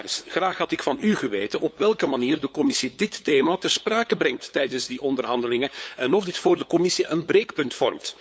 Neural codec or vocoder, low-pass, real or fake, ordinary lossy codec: codec, 16 kHz, 8 kbps, FunCodec, trained on LibriTTS, 25 frames a second; none; fake; none